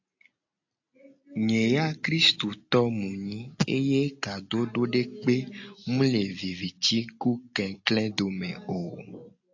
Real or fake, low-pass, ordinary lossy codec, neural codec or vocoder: real; 7.2 kHz; AAC, 48 kbps; none